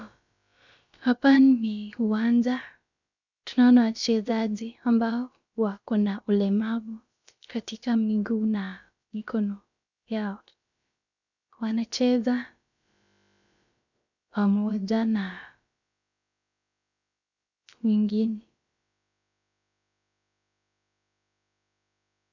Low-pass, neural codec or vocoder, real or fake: 7.2 kHz; codec, 16 kHz, about 1 kbps, DyCAST, with the encoder's durations; fake